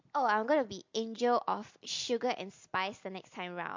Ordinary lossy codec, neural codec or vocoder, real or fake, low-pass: MP3, 48 kbps; none; real; 7.2 kHz